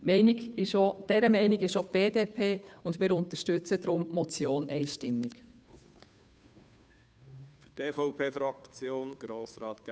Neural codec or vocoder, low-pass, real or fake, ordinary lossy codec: codec, 16 kHz, 2 kbps, FunCodec, trained on Chinese and English, 25 frames a second; none; fake; none